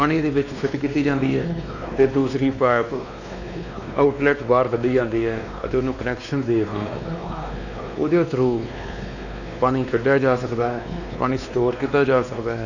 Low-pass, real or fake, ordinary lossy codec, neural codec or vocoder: 7.2 kHz; fake; none; codec, 16 kHz, 2 kbps, X-Codec, WavLM features, trained on Multilingual LibriSpeech